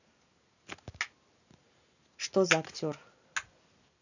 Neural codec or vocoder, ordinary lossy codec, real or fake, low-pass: none; none; real; 7.2 kHz